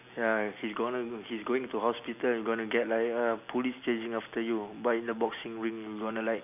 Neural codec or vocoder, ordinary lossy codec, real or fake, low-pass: none; none; real; 3.6 kHz